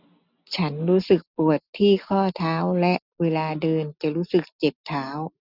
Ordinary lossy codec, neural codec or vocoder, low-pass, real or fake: none; none; 5.4 kHz; real